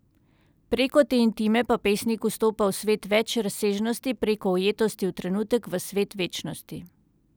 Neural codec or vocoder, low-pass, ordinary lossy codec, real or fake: none; none; none; real